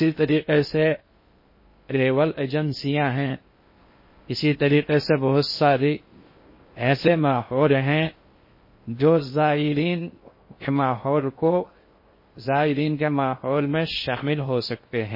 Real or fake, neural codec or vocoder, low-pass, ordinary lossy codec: fake; codec, 16 kHz in and 24 kHz out, 0.6 kbps, FocalCodec, streaming, 2048 codes; 5.4 kHz; MP3, 24 kbps